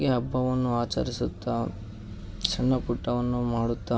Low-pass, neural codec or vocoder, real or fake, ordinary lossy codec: none; none; real; none